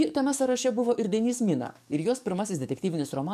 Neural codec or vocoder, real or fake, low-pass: codec, 44.1 kHz, 7.8 kbps, DAC; fake; 14.4 kHz